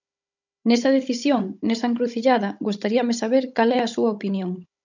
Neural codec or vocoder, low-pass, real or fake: codec, 16 kHz, 16 kbps, FunCodec, trained on Chinese and English, 50 frames a second; 7.2 kHz; fake